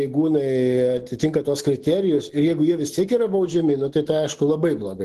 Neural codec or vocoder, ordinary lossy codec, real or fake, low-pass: none; Opus, 16 kbps; real; 14.4 kHz